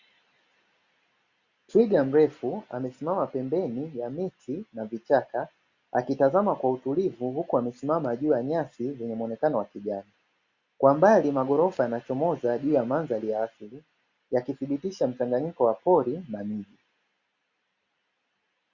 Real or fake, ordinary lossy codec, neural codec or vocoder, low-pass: real; Opus, 64 kbps; none; 7.2 kHz